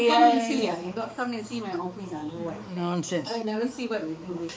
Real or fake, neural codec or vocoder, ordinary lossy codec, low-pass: fake; codec, 16 kHz, 4 kbps, X-Codec, HuBERT features, trained on balanced general audio; none; none